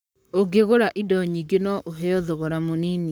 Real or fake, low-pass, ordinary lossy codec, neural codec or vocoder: fake; none; none; codec, 44.1 kHz, 7.8 kbps, Pupu-Codec